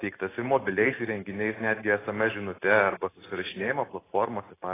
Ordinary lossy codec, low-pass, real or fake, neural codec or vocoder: AAC, 16 kbps; 3.6 kHz; real; none